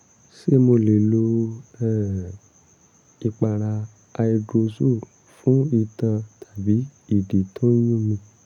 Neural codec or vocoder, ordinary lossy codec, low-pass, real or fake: none; none; 19.8 kHz; real